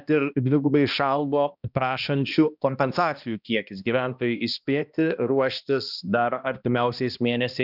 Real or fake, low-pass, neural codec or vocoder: fake; 5.4 kHz; codec, 16 kHz, 1 kbps, X-Codec, HuBERT features, trained on balanced general audio